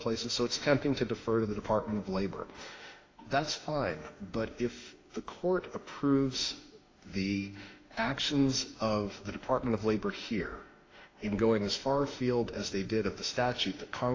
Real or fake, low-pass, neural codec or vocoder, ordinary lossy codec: fake; 7.2 kHz; autoencoder, 48 kHz, 32 numbers a frame, DAC-VAE, trained on Japanese speech; AAC, 32 kbps